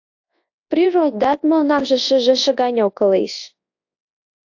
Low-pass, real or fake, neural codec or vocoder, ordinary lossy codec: 7.2 kHz; fake; codec, 24 kHz, 0.9 kbps, WavTokenizer, large speech release; AAC, 48 kbps